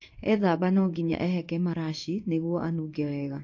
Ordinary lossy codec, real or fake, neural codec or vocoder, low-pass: AAC, 48 kbps; fake; codec, 16 kHz in and 24 kHz out, 1 kbps, XY-Tokenizer; 7.2 kHz